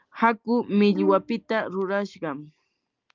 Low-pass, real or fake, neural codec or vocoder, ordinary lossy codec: 7.2 kHz; real; none; Opus, 32 kbps